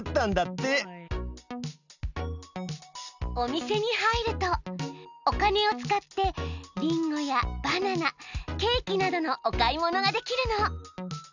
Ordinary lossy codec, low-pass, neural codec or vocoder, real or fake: none; 7.2 kHz; none; real